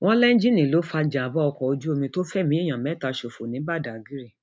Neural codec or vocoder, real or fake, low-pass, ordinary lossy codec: none; real; none; none